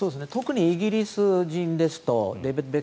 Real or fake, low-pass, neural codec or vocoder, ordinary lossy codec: real; none; none; none